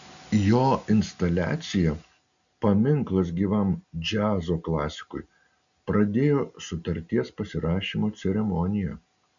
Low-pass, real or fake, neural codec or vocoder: 7.2 kHz; real; none